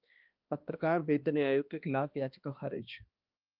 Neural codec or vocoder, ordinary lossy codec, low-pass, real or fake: codec, 16 kHz, 1 kbps, X-Codec, HuBERT features, trained on balanced general audio; Opus, 24 kbps; 5.4 kHz; fake